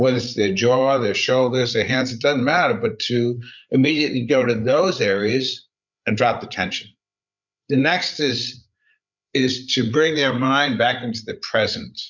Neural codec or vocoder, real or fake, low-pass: codec, 16 kHz, 8 kbps, FreqCodec, larger model; fake; 7.2 kHz